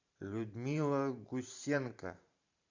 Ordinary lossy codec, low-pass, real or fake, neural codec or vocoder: MP3, 64 kbps; 7.2 kHz; fake; vocoder, 44.1 kHz, 128 mel bands every 512 samples, BigVGAN v2